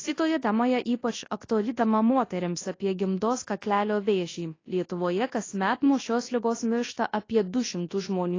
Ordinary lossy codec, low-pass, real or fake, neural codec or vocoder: AAC, 32 kbps; 7.2 kHz; fake; codec, 24 kHz, 0.9 kbps, WavTokenizer, large speech release